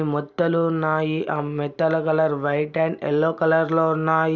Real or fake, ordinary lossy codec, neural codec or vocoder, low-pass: real; none; none; none